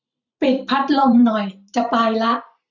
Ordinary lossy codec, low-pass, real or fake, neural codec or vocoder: none; 7.2 kHz; fake; vocoder, 44.1 kHz, 128 mel bands every 256 samples, BigVGAN v2